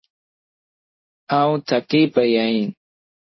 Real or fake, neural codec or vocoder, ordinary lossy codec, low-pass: fake; codec, 16 kHz in and 24 kHz out, 1 kbps, XY-Tokenizer; MP3, 24 kbps; 7.2 kHz